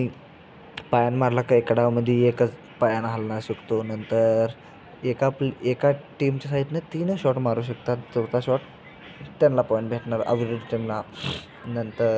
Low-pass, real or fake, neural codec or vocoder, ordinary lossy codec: none; real; none; none